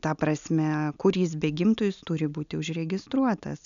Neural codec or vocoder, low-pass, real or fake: none; 7.2 kHz; real